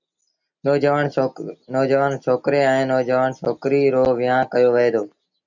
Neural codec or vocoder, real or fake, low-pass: none; real; 7.2 kHz